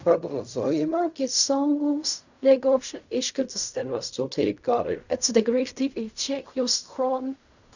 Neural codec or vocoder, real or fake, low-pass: codec, 16 kHz in and 24 kHz out, 0.4 kbps, LongCat-Audio-Codec, fine tuned four codebook decoder; fake; 7.2 kHz